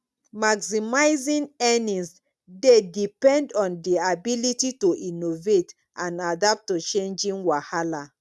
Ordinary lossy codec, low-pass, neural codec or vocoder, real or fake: none; none; none; real